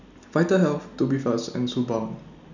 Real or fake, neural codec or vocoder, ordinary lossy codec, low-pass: real; none; none; 7.2 kHz